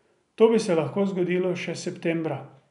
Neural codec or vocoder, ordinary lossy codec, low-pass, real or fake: none; none; 10.8 kHz; real